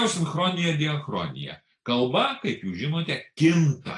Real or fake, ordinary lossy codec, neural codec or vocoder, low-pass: real; AAC, 48 kbps; none; 10.8 kHz